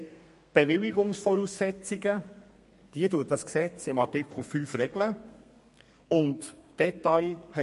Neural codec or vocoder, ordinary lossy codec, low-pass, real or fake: codec, 32 kHz, 1.9 kbps, SNAC; MP3, 48 kbps; 14.4 kHz; fake